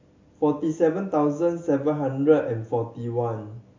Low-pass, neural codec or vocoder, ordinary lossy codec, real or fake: 7.2 kHz; none; MP3, 48 kbps; real